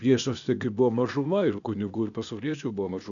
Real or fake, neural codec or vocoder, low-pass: fake; codec, 16 kHz, 0.8 kbps, ZipCodec; 7.2 kHz